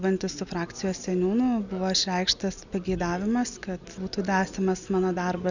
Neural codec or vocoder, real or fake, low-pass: none; real; 7.2 kHz